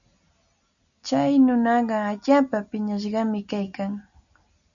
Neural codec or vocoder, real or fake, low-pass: none; real; 7.2 kHz